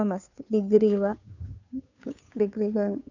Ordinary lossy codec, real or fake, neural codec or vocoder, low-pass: none; fake; codec, 16 kHz, 2 kbps, FunCodec, trained on Chinese and English, 25 frames a second; 7.2 kHz